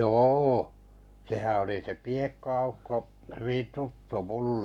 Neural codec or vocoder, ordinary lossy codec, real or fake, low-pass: codec, 44.1 kHz, 7.8 kbps, Pupu-Codec; none; fake; 19.8 kHz